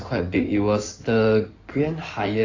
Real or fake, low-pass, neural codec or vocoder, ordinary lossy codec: fake; 7.2 kHz; codec, 16 kHz in and 24 kHz out, 2.2 kbps, FireRedTTS-2 codec; AAC, 48 kbps